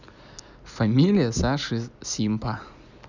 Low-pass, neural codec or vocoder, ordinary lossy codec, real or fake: 7.2 kHz; none; none; real